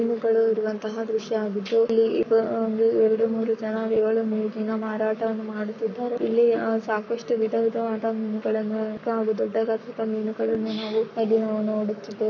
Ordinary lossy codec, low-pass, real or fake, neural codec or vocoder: none; 7.2 kHz; fake; codec, 44.1 kHz, 7.8 kbps, Pupu-Codec